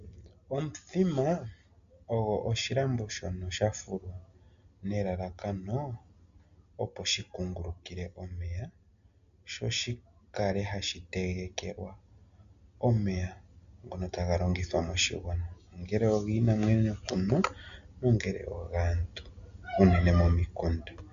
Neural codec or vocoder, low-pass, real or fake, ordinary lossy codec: none; 7.2 kHz; real; AAC, 96 kbps